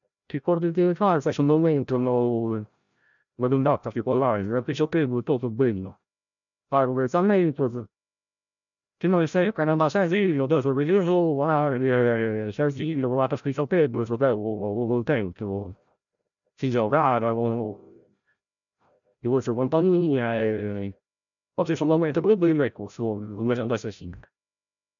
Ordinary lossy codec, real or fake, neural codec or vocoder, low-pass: none; fake; codec, 16 kHz, 0.5 kbps, FreqCodec, larger model; 7.2 kHz